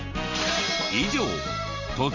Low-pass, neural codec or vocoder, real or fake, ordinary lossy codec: 7.2 kHz; none; real; none